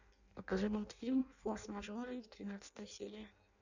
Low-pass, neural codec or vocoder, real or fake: 7.2 kHz; codec, 16 kHz in and 24 kHz out, 0.6 kbps, FireRedTTS-2 codec; fake